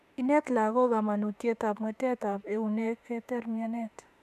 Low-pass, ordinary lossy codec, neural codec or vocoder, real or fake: 14.4 kHz; none; autoencoder, 48 kHz, 32 numbers a frame, DAC-VAE, trained on Japanese speech; fake